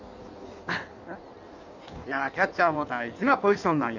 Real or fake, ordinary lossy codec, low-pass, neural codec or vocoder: fake; none; 7.2 kHz; codec, 16 kHz in and 24 kHz out, 1.1 kbps, FireRedTTS-2 codec